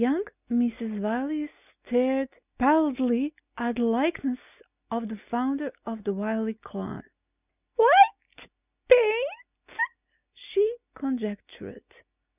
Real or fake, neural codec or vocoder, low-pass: real; none; 3.6 kHz